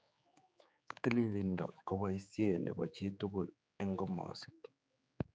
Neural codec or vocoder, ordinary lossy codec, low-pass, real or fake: codec, 16 kHz, 4 kbps, X-Codec, HuBERT features, trained on general audio; none; none; fake